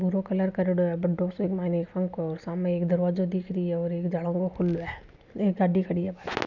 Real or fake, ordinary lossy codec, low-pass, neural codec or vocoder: real; none; 7.2 kHz; none